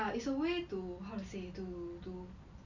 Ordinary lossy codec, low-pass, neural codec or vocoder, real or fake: MP3, 64 kbps; 7.2 kHz; none; real